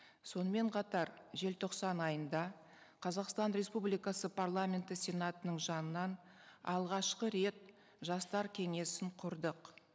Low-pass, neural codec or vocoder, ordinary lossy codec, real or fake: none; none; none; real